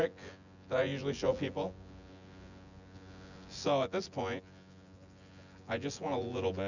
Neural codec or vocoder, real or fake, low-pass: vocoder, 24 kHz, 100 mel bands, Vocos; fake; 7.2 kHz